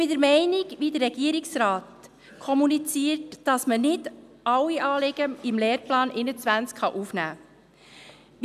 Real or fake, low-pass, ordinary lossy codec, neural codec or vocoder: real; 14.4 kHz; none; none